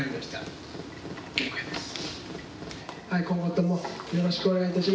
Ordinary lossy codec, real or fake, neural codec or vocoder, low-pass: none; real; none; none